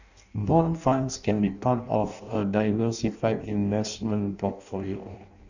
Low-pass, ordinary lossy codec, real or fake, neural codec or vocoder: 7.2 kHz; none; fake; codec, 16 kHz in and 24 kHz out, 0.6 kbps, FireRedTTS-2 codec